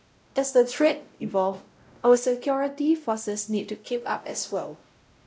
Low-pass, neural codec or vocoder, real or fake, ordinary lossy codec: none; codec, 16 kHz, 0.5 kbps, X-Codec, WavLM features, trained on Multilingual LibriSpeech; fake; none